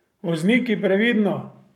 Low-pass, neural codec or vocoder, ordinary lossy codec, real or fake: 19.8 kHz; codec, 44.1 kHz, 7.8 kbps, Pupu-Codec; none; fake